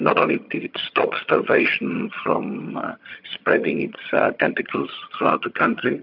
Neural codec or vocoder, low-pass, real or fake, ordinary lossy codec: vocoder, 22.05 kHz, 80 mel bands, HiFi-GAN; 5.4 kHz; fake; MP3, 48 kbps